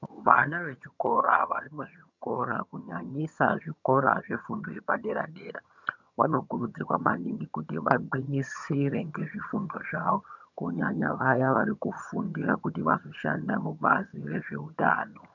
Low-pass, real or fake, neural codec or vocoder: 7.2 kHz; fake; vocoder, 22.05 kHz, 80 mel bands, HiFi-GAN